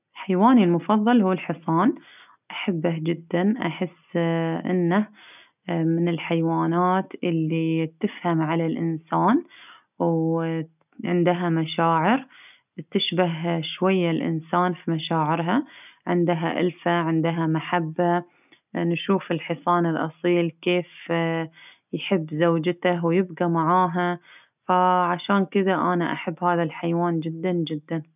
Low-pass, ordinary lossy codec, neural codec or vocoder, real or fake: 3.6 kHz; none; none; real